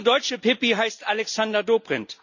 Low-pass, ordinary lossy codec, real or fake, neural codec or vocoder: 7.2 kHz; none; real; none